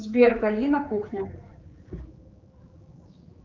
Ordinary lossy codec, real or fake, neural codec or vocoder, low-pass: Opus, 24 kbps; fake; codec, 16 kHz, 4 kbps, X-Codec, HuBERT features, trained on general audio; 7.2 kHz